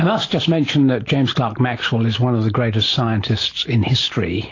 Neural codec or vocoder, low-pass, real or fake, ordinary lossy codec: none; 7.2 kHz; real; AAC, 32 kbps